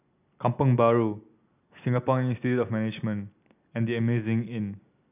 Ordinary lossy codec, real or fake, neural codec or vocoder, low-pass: none; real; none; 3.6 kHz